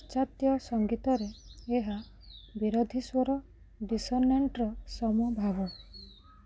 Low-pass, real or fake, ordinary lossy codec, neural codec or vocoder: none; real; none; none